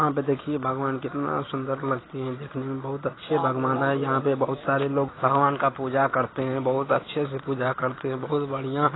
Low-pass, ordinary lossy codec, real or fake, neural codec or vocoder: 7.2 kHz; AAC, 16 kbps; real; none